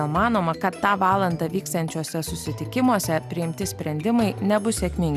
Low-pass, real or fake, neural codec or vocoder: 14.4 kHz; real; none